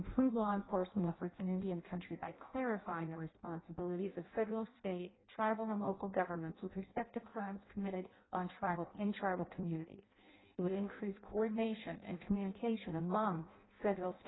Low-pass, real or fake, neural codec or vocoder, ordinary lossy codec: 7.2 kHz; fake; codec, 16 kHz in and 24 kHz out, 0.6 kbps, FireRedTTS-2 codec; AAC, 16 kbps